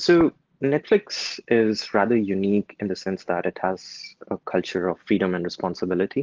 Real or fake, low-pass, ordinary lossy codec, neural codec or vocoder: real; 7.2 kHz; Opus, 16 kbps; none